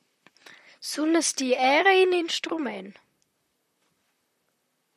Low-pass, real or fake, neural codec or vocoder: 14.4 kHz; fake; vocoder, 44.1 kHz, 128 mel bands, Pupu-Vocoder